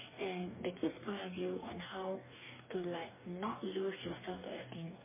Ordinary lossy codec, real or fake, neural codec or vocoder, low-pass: MP3, 16 kbps; fake; codec, 44.1 kHz, 2.6 kbps, DAC; 3.6 kHz